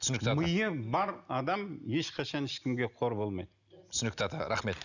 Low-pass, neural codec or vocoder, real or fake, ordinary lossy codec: 7.2 kHz; none; real; none